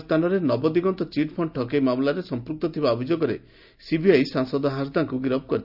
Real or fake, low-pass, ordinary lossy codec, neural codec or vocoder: real; 5.4 kHz; none; none